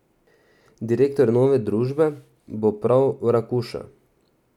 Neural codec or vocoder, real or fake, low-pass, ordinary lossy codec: none; real; 19.8 kHz; none